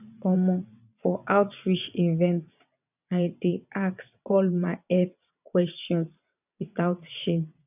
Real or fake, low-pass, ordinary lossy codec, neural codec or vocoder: fake; 3.6 kHz; none; vocoder, 22.05 kHz, 80 mel bands, WaveNeXt